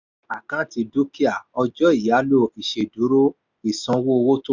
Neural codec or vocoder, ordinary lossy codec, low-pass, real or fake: none; none; 7.2 kHz; real